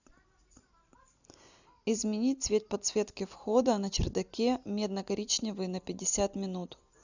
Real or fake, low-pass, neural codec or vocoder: real; 7.2 kHz; none